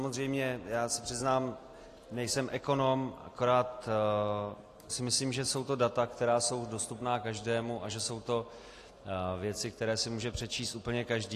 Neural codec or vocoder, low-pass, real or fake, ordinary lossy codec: none; 14.4 kHz; real; AAC, 48 kbps